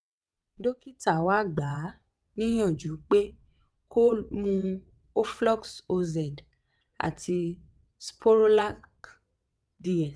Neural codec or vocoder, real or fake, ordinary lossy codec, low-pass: vocoder, 22.05 kHz, 80 mel bands, WaveNeXt; fake; none; none